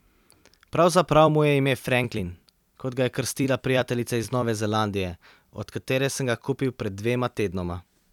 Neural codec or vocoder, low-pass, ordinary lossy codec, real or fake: vocoder, 44.1 kHz, 128 mel bands every 256 samples, BigVGAN v2; 19.8 kHz; none; fake